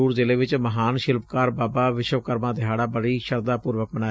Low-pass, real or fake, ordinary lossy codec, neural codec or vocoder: none; real; none; none